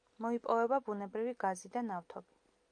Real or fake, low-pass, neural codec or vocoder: real; 9.9 kHz; none